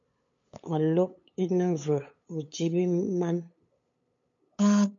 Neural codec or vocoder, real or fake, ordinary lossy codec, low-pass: codec, 16 kHz, 8 kbps, FunCodec, trained on LibriTTS, 25 frames a second; fake; MP3, 48 kbps; 7.2 kHz